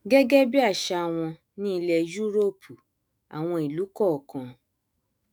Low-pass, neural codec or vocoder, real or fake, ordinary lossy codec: none; autoencoder, 48 kHz, 128 numbers a frame, DAC-VAE, trained on Japanese speech; fake; none